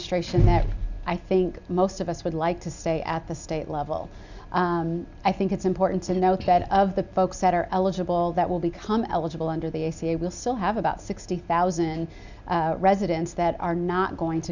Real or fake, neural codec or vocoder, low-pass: real; none; 7.2 kHz